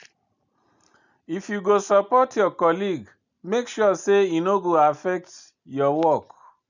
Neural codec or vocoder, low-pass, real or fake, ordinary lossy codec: none; 7.2 kHz; real; none